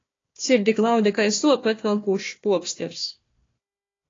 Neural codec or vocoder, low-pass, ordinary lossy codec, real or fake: codec, 16 kHz, 1 kbps, FunCodec, trained on Chinese and English, 50 frames a second; 7.2 kHz; AAC, 32 kbps; fake